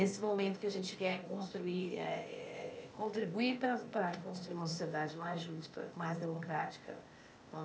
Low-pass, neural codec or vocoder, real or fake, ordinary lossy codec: none; codec, 16 kHz, 0.8 kbps, ZipCodec; fake; none